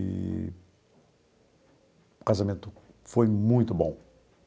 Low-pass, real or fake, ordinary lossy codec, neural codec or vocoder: none; real; none; none